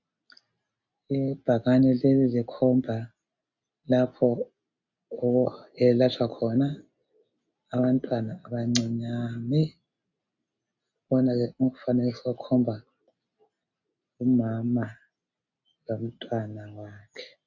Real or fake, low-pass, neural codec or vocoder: real; 7.2 kHz; none